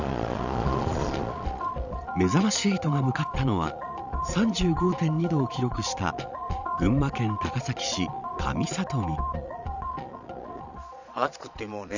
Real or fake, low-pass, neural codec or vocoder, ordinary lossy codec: fake; 7.2 kHz; vocoder, 22.05 kHz, 80 mel bands, Vocos; none